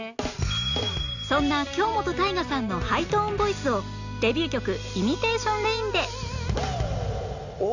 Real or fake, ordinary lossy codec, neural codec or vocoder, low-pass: real; none; none; 7.2 kHz